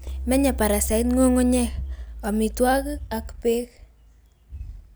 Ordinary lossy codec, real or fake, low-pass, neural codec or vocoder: none; real; none; none